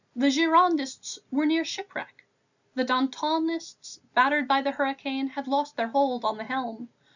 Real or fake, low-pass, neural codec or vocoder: real; 7.2 kHz; none